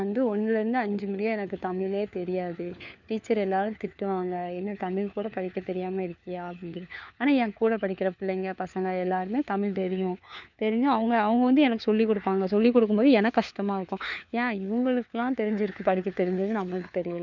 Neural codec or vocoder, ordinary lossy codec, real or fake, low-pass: codec, 16 kHz, 4 kbps, FunCodec, trained on LibriTTS, 50 frames a second; none; fake; 7.2 kHz